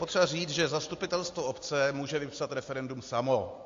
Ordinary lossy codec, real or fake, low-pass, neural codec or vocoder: AAC, 48 kbps; real; 7.2 kHz; none